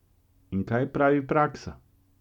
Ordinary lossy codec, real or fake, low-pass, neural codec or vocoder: none; real; 19.8 kHz; none